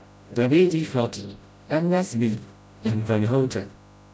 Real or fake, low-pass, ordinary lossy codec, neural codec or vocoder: fake; none; none; codec, 16 kHz, 0.5 kbps, FreqCodec, smaller model